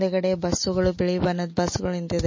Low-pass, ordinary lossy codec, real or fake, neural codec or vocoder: 7.2 kHz; MP3, 32 kbps; real; none